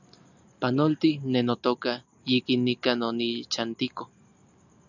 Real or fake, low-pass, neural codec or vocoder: real; 7.2 kHz; none